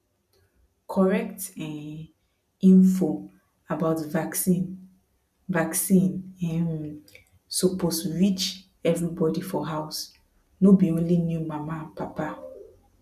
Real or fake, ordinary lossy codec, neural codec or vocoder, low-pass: real; none; none; 14.4 kHz